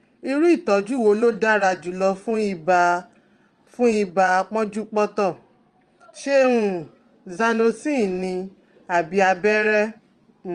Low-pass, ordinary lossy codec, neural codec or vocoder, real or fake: 9.9 kHz; Opus, 32 kbps; vocoder, 22.05 kHz, 80 mel bands, Vocos; fake